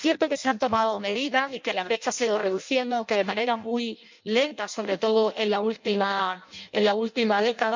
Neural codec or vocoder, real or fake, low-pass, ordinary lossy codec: codec, 16 kHz in and 24 kHz out, 0.6 kbps, FireRedTTS-2 codec; fake; 7.2 kHz; MP3, 64 kbps